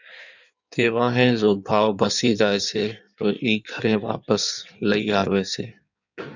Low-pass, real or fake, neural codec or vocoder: 7.2 kHz; fake; codec, 16 kHz in and 24 kHz out, 1.1 kbps, FireRedTTS-2 codec